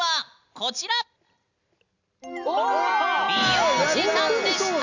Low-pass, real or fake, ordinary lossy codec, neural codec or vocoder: 7.2 kHz; real; none; none